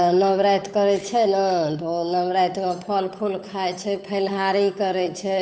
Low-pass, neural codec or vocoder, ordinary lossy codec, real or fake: none; codec, 16 kHz, 8 kbps, FunCodec, trained on Chinese and English, 25 frames a second; none; fake